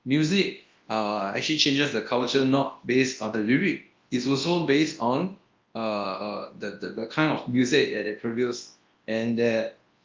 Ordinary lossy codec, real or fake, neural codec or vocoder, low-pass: Opus, 32 kbps; fake; codec, 24 kHz, 0.9 kbps, WavTokenizer, large speech release; 7.2 kHz